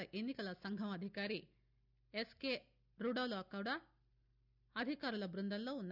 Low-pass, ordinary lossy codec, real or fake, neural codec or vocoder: 5.4 kHz; none; real; none